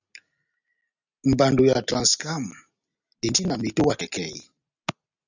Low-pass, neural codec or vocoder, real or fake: 7.2 kHz; none; real